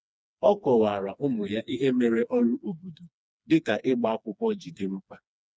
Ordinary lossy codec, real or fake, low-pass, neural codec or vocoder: none; fake; none; codec, 16 kHz, 2 kbps, FreqCodec, smaller model